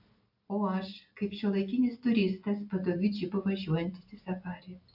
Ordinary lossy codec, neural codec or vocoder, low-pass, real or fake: AAC, 48 kbps; none; 5.4 kHz; real